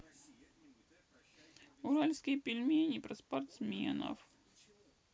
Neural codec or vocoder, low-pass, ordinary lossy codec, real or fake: none; none; none; real